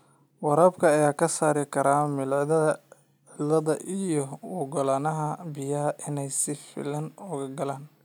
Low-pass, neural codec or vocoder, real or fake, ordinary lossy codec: none; none; real; none